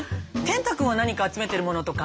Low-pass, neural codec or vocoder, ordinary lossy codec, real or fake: none; none; none; real